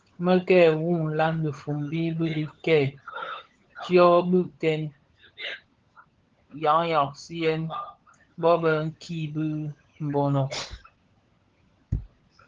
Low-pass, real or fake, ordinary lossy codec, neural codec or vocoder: 7.2 kHz; fake; Opus, 16 kbps; codec, 16 kHz, 16 kbps, FunCodec, trained on LibriTTS, 50 frames a second